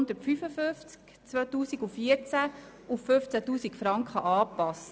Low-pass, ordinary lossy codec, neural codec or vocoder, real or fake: none; none; none; real